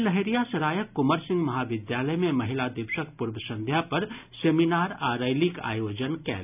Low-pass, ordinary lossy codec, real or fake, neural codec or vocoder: 3.6 kHz; none; real; none